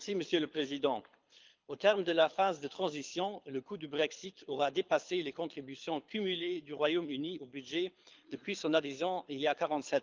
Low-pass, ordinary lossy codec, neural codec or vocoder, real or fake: 7.2 kHz; Opus, 24 kbps; codec, 24 kHz, 6 kbps, HILCodec; fake